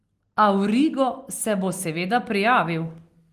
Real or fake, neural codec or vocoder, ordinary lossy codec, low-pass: real; none; Opus, 24 kbps; 14.4 kHz